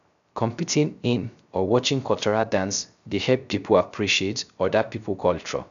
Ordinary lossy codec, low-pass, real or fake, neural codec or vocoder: none; 7.2 kHz; fake; codec, 16 kHz, 0.3 kbps, FocalCodec